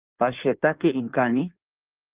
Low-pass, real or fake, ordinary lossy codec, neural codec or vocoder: 3.6 kHz; fake; Opus, 32 kbps; codec, 16 kHz in and 24 kHz out, 1.1 kbps, FireRedTTS-2 codec